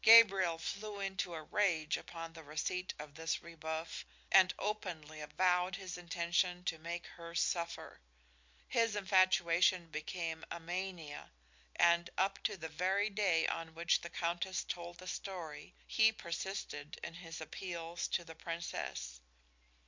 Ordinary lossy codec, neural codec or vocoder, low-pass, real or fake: MP3, 64 kbps; none; 7.2 kHz; real